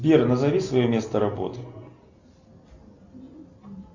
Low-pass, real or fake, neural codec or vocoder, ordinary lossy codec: 7.2 kHz; real; none; Opus, 64 kbps